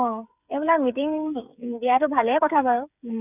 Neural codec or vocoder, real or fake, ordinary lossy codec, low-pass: codec, 16 kHz, 8 kbps, FreqCodec, smaller model; fake; none; 3.6 kHz